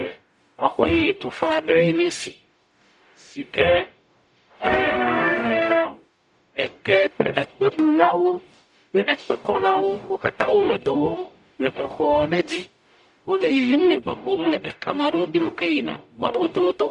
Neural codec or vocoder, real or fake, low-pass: codec, 44.1 kHz, 0.9 kbps, DAC; fake; 10.8 kHz